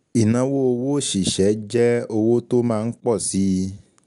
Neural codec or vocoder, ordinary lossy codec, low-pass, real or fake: none; MP3, 96 kbps; 10.8 kHz; real